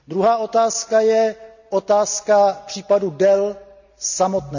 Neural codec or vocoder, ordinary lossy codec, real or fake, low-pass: none; none; real; 7.2 kHz